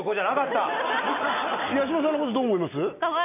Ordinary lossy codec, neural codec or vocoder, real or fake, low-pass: none; none; real; 3.6 kHz